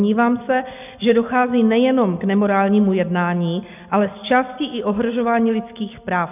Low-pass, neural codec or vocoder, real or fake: 3.6 kHz; none; real